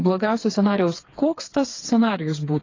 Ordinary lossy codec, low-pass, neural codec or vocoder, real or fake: AAC, 32 kbps; 7.2 kHz; codec, 16 kHz, 4 kbps, FreqCodec, smaller model; fake